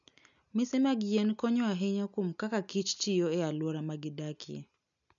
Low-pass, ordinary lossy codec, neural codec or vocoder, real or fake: 7.2 kHz; none; none; real